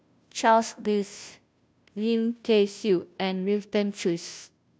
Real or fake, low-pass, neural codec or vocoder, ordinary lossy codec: fake; none; codec, 16 kHz, 0.5 kbps, FunCodec, trained on Chinese and English, 25 frames a second; none